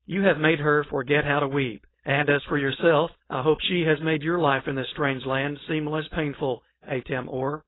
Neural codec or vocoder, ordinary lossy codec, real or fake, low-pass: codec, 16 kHz, 4.8 kbps, FACodec; AAC, 16 kbps; fake; 7.2 kHz